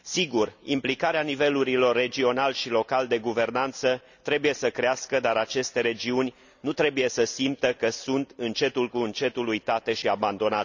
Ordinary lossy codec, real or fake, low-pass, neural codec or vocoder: none; real; 7.2 kHz; none